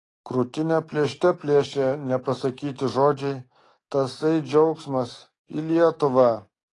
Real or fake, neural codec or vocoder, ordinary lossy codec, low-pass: fake; codec, 44.1 kHz, 7.8 kbps, DAC; AAC, 32 kbps; 10.8 kHz